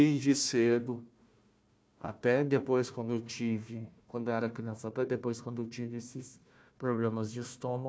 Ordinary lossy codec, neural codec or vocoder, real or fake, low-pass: none; codec, 16 kHz, 1 kbps, FunCodec, trained on Chinese and English, 50 frames a second; fake; none